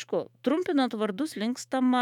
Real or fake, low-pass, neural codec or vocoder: fake; 19.8 kHz; autoencoder, 48 kHz, 128 numbers a frame, DAC-VAE, trained on Japanese speech